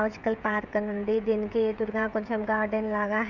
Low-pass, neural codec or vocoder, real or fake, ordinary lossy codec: 7.2 kHz; codec, 16 kHz, 16 kbps, FreqCodec, smaller model; fake; none